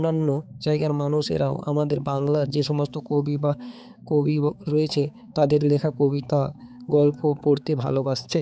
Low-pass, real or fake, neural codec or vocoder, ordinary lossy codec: none; fake; codec, 16 kHz, 4 kbps, X-Codec, HuBERT features, trained on balanced general audio; none